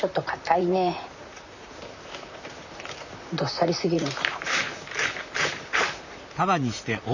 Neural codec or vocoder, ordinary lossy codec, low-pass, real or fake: vocoder, 44.1 kHz, 128 mel bands, Pupu-Vocoder; none; 7.2 kHz; fake